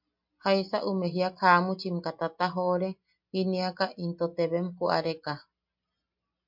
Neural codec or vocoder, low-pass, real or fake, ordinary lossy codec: none; 5.4 kHz; real; MP3, 48 kbps